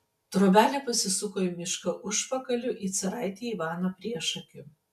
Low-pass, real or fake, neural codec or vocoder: 14.4 kHz; real; none